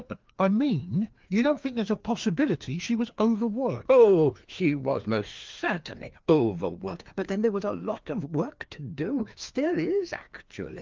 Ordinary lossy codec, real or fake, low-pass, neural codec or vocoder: Opus, 32 kbps; fake; 7.2 kHz; codec, 16 kHz, 2 kbps, FreqCodec, larger model